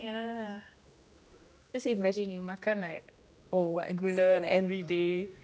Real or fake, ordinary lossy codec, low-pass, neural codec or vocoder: fake; none; none; codec, 16 kHz, 1 kbps, X-Codec, HuBERT features, trained on general audio